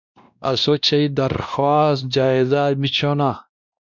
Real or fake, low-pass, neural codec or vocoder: fake; 7.2 kHz; codec, 16 kHz, 1 kbps, X-Codec, WavLM features, trained on Multilingual LibriSpeech